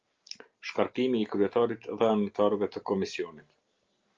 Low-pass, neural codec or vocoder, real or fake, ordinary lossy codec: 7.2 kHz; none; real; Opus, 24 kbps